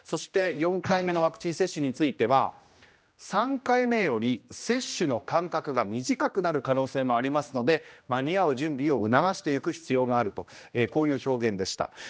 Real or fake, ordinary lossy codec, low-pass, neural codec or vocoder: fake; none; none; codec, 16 kHz, 1 kbps, X-Codec, HuBERT features, trained on general audio